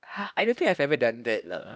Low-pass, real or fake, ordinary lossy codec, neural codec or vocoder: none; fake; none; codec, 16 kHz, 1 kbps, X-Codec, HuBERT features, trained on LibriSpeech